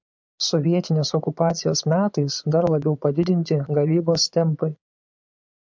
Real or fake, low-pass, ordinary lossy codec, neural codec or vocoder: fake; 7.2 kHz; MP3, 64 kbps; vocoder, 22.05 kHz, 80 mel bands, Vocos